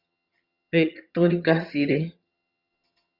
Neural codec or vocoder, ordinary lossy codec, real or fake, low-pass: vocoder, 22.05 kHz, 80 mel bands, HiFi-GAN; Opus, 64 kbps; fake; 5.4 kHz